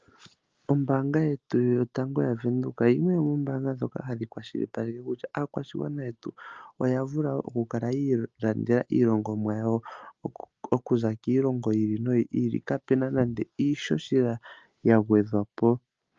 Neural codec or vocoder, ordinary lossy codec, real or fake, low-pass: none; Opus, 24 kbps; real; 7.2 kHz